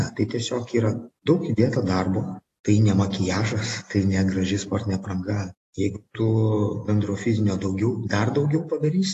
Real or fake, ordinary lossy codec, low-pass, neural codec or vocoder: real; AAC, 48 kbps; 14.4 kHz; none